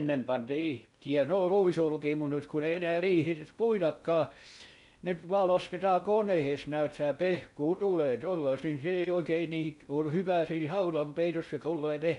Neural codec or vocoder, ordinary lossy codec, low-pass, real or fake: codec, 16 kHz in and 24 kHz out, 0.6 kbps, FocalCodec, streaming, 4096 codes; none; 10.8 kHz; fake